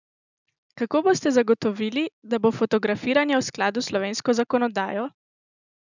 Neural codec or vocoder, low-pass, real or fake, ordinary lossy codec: none; 7.2 kHz; real; none